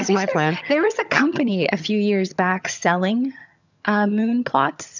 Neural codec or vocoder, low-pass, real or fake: vocoder, 22.05 kHz, 80 mel bands, HiFi-GAN; 7.2 kHz; fake